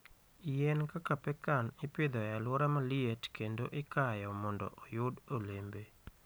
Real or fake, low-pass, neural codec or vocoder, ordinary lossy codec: real; none; none; none